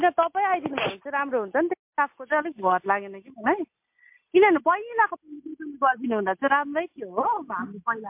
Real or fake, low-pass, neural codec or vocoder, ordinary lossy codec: real; 3.6 kHz; none; MP3, 32 kbps